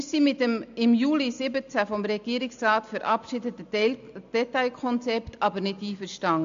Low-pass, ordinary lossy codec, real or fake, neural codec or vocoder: 7.2 kHz; MP3, 64 kbps; real; none